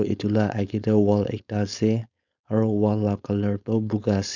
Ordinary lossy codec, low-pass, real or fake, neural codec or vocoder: none; 7.2 kHz; fake; codec, 16 kHz, 4.8 kbps, FACodec